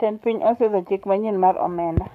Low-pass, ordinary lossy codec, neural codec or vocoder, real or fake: 14.4 kHz; none; codec, 44.1 kHz, 7.8 kbps, Pupu-Codec; fake